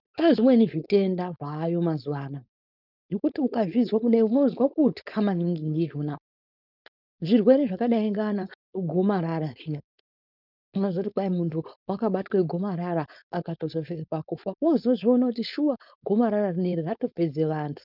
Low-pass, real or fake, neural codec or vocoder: 5.4 kHz; fake; codec, 16 kHz, 4.8 kbps, FACodec